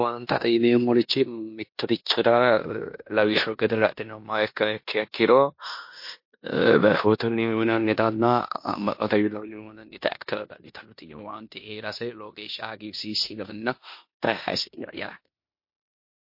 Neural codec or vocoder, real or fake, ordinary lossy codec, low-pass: codec, 16 kHz in and 24 kHz out, 0.9 kbps, LongCat-Audio-Codec, four codebook decoder; fake; MP3, 32 kbps; 5.4 kHz